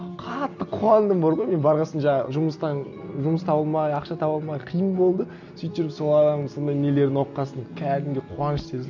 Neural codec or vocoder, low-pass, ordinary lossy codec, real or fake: none; 7.2 kHz; none; real